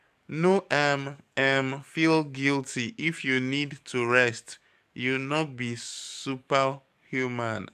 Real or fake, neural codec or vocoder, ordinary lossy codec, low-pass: fake; codec, 44.1 kHz, 7.8 kbps, DAC; none; 14.4 kHz